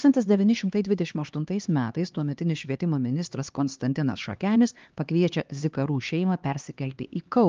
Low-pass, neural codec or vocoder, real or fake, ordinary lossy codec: 7.2 kHz; codec, 16 kHz, 2 kbps, X-Codec, HuBERT features, trained on LibriSpeech; fake; Opus, 24 kbps